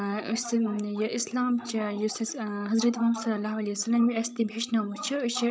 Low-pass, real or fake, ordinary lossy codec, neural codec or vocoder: none; fake; none; codec, 16 kHz, 16 kbps, FreqCodec, larger model